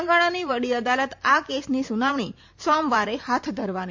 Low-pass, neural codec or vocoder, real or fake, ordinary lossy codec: 7.2 kHz; vocoder, 44.1 kHz, 128 mel bands every 512 samples, BigVGAN v2; fake; MP3, 48 kbps